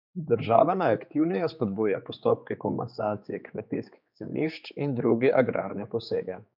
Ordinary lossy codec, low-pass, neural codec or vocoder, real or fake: none; 5.4 kHz; codec, 16 kHz, 4 kbps, X-Codec, HuBERT features, trained on general audio; fake